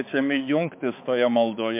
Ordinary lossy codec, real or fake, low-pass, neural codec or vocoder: MP3, 32 kbps; fake; 3.6 kHz; codec, 16 kHz, 4 kbps, X-Codec, HuBERT features, trained on balanced general audio